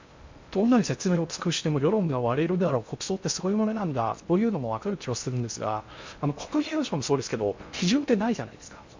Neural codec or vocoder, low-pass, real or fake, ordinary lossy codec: codec, 16 kHz in and 24 kHz out, 0.6 kbps, FocalCodec, streaming, 2048 codes; 7.2 kHz; fake; MP3, 64 kbps